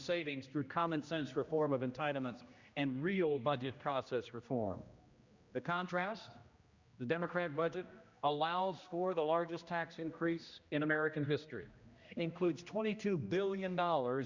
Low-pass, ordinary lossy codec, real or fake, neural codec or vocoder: 7.2 kHz; Opus, 64 kbps; fake; codec, 16 kHz, 1 kbps, X-Codec, HuBERT features, trained on general audio